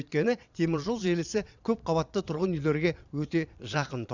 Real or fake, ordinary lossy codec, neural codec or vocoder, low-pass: fake; none; vocoder, 22.05 kHz, 80 mel bands, Vocos; 7.2 kHz